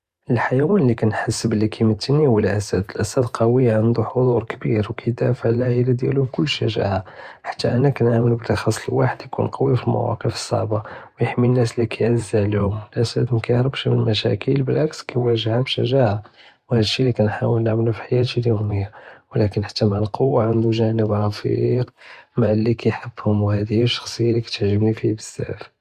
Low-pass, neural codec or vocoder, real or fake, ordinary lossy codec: 14.4 kHz; vocoder, 44.1 kHz, 128 mel bands every 512 samples, BigVGAN v2; fake; Opus, 64 kbps